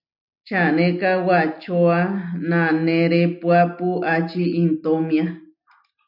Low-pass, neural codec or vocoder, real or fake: 5.4 kHz; none; real